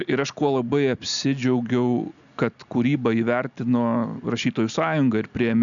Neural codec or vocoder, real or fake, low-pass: none; real; 7.2 kHz